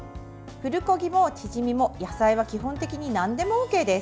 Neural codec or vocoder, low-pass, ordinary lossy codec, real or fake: none; none; none; real